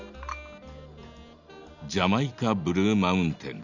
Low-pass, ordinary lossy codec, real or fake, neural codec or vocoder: 7.2 kHz; none; real; none